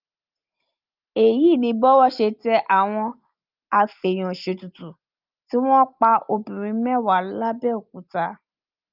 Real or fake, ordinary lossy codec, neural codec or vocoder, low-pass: real; Opus, 24 kbps; none; 5.4 kHz